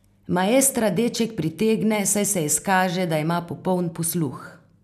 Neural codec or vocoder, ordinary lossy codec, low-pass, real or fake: none; none; 14.4 kHz; real